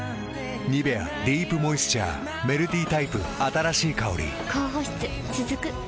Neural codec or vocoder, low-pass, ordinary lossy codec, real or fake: none; none; none; real